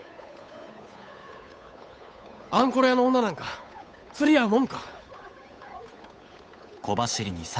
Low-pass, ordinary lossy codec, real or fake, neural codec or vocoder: none; none; fake; codec, 16 kHz, 8 kbps, FunCodec, trained on Chinese and English, 25 frames a second